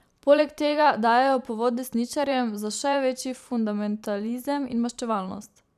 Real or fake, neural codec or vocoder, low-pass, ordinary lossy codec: fake; vocoder, 44.1 kHz, 128 mel bands every 512 samples, BigVGAN v2; 14.4 kHz; none